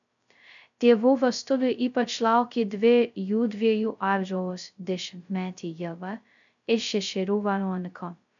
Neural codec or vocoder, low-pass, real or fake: codec, 16 kHz, 0.2 kbps, FocalCodec; 7.2 kHz; fake